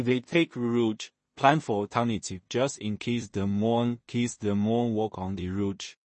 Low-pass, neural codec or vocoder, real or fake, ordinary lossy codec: 10.8 kHz; codec, 16 kHz in and 24 kHz out, 0.4 kbps, LongCat-Audio-Codec, two codebook decoder; fake; MP3, 32 kbps